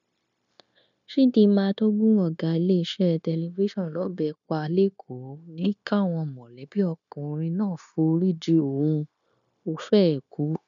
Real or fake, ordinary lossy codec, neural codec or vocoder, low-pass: fake; none; codec, 16 kHz, 0.9 kbps, LongCat-Audio-Codec; 7.2 kHz